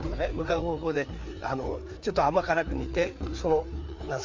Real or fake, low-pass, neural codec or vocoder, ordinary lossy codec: fake; 7.2 kHz; codec, 16 kHz, 4 kbps, FreqCodec, larger model; MP3, 48 kbps